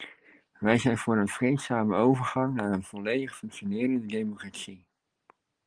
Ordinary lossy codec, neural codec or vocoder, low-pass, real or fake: Opus, 24 kbps; none; 9.9 kHz; real